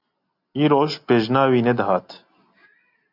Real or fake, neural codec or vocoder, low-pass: real; none; 5.4 kHz